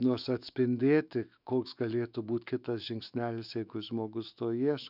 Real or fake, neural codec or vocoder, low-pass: fake; autoencoder, 48 kHz, 128 numbers a frame, DAC-VAE, trained on Japanese speech; 5.4 kHz